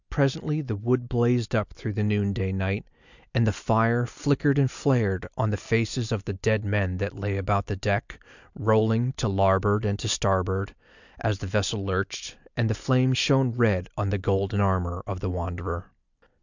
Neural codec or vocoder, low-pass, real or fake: none; 7.2 kHz; real